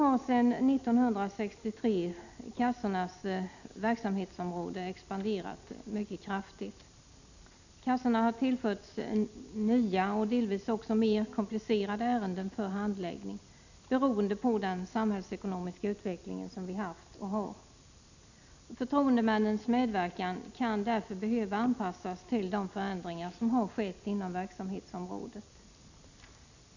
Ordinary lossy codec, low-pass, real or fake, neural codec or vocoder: none; 7.2 kHz; real; none